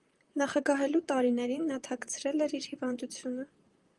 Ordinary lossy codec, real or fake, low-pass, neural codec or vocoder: Opus, 32 kbps; fake; 10.8 kHz; vocoder, 44.1 kHz, 128 mel bands, Pupu-Vocoder